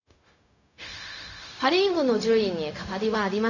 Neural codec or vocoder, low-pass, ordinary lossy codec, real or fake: codec, 16 kHz, 0.4 kbps, LongCat-Audio-Codec; 7.2 kHz; none; fake